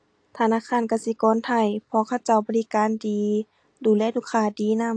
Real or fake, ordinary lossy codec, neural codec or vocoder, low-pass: real; AAC, 48 kbps; none; 9.9 kHz